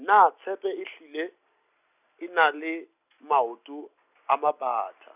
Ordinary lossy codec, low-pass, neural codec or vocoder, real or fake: none; 3.6 kHz; none; real